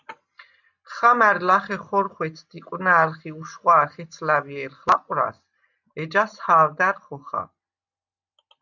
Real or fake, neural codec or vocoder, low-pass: real; none; 7.2 kHz